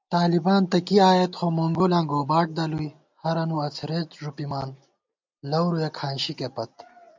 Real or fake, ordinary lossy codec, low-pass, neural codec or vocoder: real; MP3, 64 kbps; 7.2 kHz; none